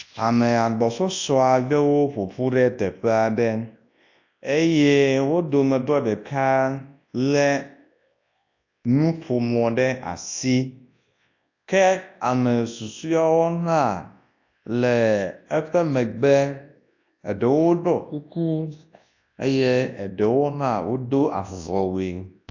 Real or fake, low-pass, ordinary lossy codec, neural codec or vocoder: fake; 7.2 kHz; AAC, 48 kbps; codec, 24 kHz, 0.9 kbps, WavTokenizer, large speech release